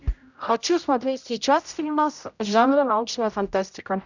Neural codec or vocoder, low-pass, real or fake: codec, 16 kHz, 0.5 kbps, X-Codec, HuBERT features, trained on general audio; 7.2 kHz; fake